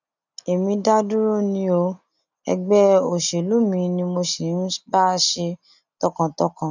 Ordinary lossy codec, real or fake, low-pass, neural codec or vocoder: none; real; 7.2 kHz; none